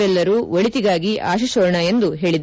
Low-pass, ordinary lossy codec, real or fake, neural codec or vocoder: none; none; real; none